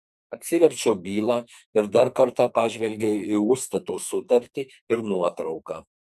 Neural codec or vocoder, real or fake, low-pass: codec, 44.1 kHz, 2.6 kbps, SNAC; fake; 14.4 kHz